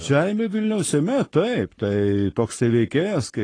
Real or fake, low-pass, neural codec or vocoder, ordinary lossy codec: fake; 9.9 kHz; codec, 44.1 kHz, 7.8 kbps, Pupu-Codec; AAC, 32 kbps